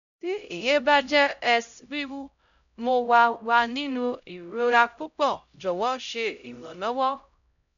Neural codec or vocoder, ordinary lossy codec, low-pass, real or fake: codec, 16 kHz, 0.5 kbps, X-Codec, HuBERT features, trained on LibriSpeech; none; 7.2 kHz; fake